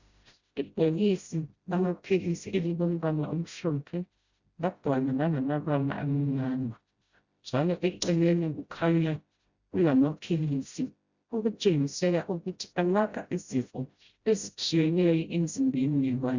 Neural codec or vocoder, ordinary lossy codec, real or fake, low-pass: codec, 16 kHz, 0.5 kbps, FreqCodec, smaller model; Opus, 64 kbps; fake; 7.2 kHz